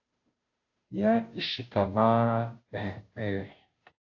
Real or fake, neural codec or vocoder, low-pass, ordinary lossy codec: fake; codec, 16 kHz, 0.5 kbps, FunCodec, trained on Chinese and English, 25 frames a second; 7.2 kHz; AAC, 48 kbps